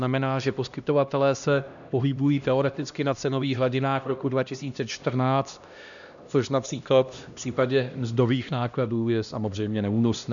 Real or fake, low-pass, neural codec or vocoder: fake; 7.2 kHz; codec, 16 kHz, 1 kbps, X-Codec, HuBERT features, trained on LibriSpeech